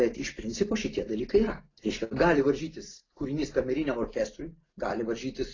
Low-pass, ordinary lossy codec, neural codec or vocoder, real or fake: 7.2 kHz; AAC, 32 kbps; none; real